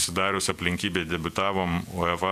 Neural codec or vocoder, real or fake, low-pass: vocoder, 48 kHz, 128 mel bands, Vocos; fake; 14.4 kHz